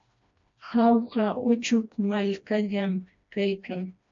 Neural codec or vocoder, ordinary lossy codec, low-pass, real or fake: codec, 16 kHz, 1 kbps, FreqCodec, smaller model; MP3, 48 kbps; 7.2 kHz; fake